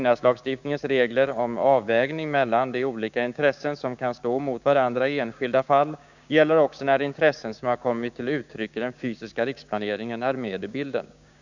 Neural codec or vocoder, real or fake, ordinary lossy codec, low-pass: codec, 16 kHz, 6 kbps, DAC; fake; none; 7.2 kHz